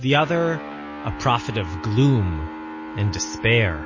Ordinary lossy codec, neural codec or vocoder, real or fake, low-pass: MP3, 32 kbps; none; real; 7.2 kHz